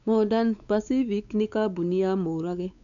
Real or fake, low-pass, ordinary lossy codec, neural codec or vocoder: fake; 7.2 kHz; none; codec, 16 kHz, 4 kbps, X-Codec, WavLM features, trained on Multilingual LibriSpeech